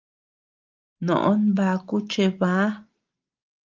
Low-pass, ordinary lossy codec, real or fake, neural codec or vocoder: 7.2 kHz; Opus, 32 kbps; real; none